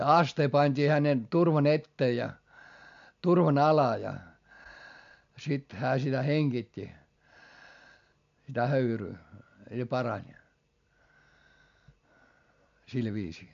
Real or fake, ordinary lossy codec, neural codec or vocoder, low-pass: real; MP3, 64 kbps; none; 7.2 kHz